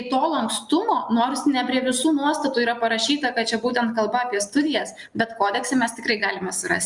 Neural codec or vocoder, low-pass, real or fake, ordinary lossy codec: none; 10.8 kHz; real; Opus, 64 kbps